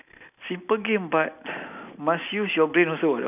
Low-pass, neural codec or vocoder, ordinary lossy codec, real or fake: 3.6 kHz; none; none; real